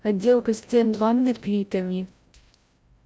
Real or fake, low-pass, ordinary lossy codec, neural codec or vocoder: fake; none; none; codec, 16 kHz, 0.5 kbps, FreqCodec, larger model